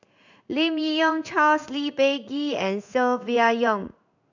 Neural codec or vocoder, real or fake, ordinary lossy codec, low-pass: codec, 16 kHz in and 24 kHz out, 1 kbps, XY-Tokenizer; fake; none; 7.2 kHz